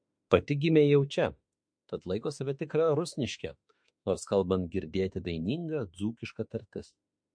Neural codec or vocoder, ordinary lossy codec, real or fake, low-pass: autoencoder, 48 kHz, 32 numbers a frame, DAC-VAE, trained on Japanese speech; MP3, 48 kbps; fake; 9.9 kHz